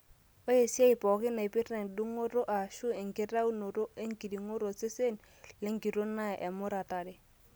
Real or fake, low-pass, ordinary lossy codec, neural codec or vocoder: real; none; none; none